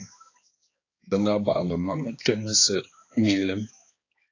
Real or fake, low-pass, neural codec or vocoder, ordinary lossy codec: fake; 7.2 kHz; codec, 16 kHz, 2 kbps, X-Codec, HuBERT features, trained on balanced general audio; AAC, 32 kbps